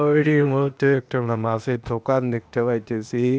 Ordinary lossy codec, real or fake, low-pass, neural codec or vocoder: none; fake; none; codec, 16 kHz, 0.8 kbps, ZipCodec